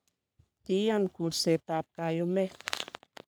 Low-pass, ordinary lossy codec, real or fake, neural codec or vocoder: none; none; fake; codec, 44.1 kHz, 3.4 kbps, Pupu-Codec